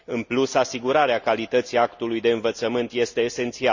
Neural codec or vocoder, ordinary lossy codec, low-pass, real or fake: none; Opus, 64 kbps; 7.2 kHz; real